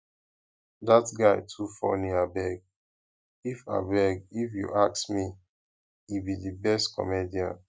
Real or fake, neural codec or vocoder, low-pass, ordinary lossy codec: real; none; none; none